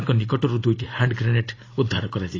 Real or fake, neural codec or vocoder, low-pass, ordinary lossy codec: real; none; 7.2 kHz; AAC, 32 kbps